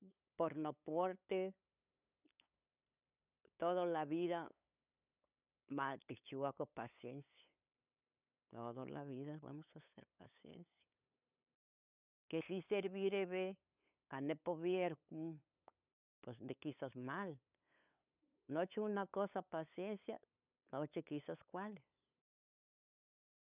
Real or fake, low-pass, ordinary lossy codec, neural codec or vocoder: fake; 3.6 kHz; none; codec, 16 kHz, 8 kbps, FunCodec, trained on LibriTTS, 25 frames a second